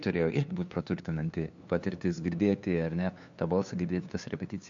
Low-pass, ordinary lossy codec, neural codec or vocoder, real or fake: 7.2 kHz; MP3, 64 kbps; codec, 16 kHz, 2 kbps, FunCodec, trained on LibriTTS, 25 frames a second; fake